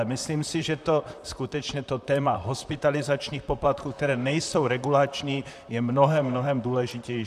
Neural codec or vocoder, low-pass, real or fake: vocoder, 44.1 kHz, 128 mel bands, Pupu-Vocoder; 14.4 kHz; fake